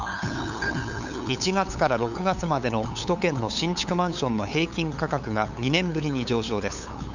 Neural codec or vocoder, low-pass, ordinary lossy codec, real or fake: codec, 16 kHz, 8 kbps, FunCodec, trained on LibriTTS, 25 frames a second; 7.2 kHz; none; fake